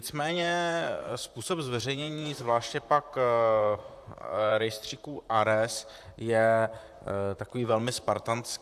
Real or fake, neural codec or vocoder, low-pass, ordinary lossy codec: fake; vocoder, 44.1 kHz, 128 mel bands, Pupu-Vocoder; 14.4 kHz; AAC, 96 kbps